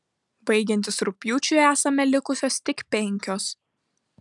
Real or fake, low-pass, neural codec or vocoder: real; 10.8 kHz; none